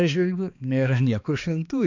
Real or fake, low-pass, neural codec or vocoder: fake; 7.2 kHz; codec, 16 kHz, 2 kbps, X-Codec, HuBERT features, trained on balanced general audio